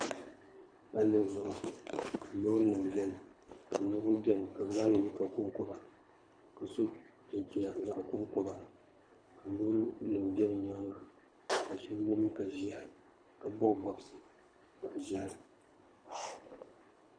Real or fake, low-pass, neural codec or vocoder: fake; 9.9 kHz; codec, 24 kHz, 3 kbps, HILCodec